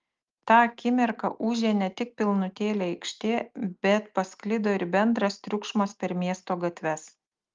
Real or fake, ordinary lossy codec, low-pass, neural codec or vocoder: real; Opus, 32 kbps; 7.2 kHz; none